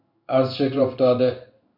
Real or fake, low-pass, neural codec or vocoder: fake; 5.4 kHz; codec, 16 kHz in and 24 kHz out, 1 kbps, XY-Tokenizer